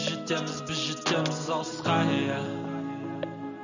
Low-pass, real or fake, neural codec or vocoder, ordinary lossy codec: 7.2 kHz; real; none; none